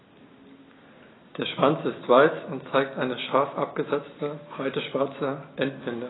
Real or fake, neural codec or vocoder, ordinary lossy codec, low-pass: real; none; AAC, 16 kbps; 7.2 kHz